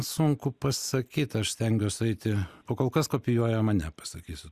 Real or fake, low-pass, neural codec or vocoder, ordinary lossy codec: real; 14.4 kHz; none; Opus, 64 kbps